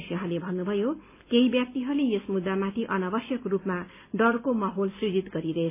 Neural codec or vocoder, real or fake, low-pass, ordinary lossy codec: none; real; 3.6 kHz; AAC, 24 kbps